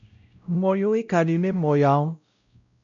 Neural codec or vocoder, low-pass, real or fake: codec, 16 kHz, 0.5 kbps, X-Codec, WavLM features, trained on Multilingual LibriSpeech; 7.2 kHz; fake